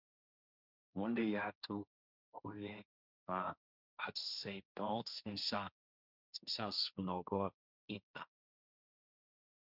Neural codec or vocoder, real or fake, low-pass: codec, 16 kHz, 1.1 kbps, Voila-Tokenizer; fake; 5.4 kHz